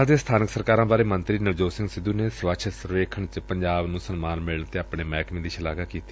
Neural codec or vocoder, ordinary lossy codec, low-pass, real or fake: none; none; none; real